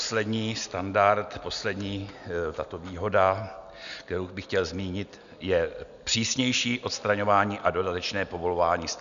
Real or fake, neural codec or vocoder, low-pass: real; none; 7.2 kHz